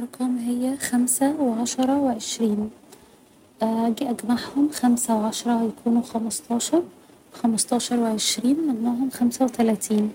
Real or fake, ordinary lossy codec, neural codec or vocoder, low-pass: real; none; none; 19.8 kHz